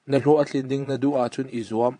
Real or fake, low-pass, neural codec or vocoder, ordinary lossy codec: fake; 9.9 kHz; vocoder, 22.05 kHz, 80 mel bands, Vocos; AAC, 64 kbps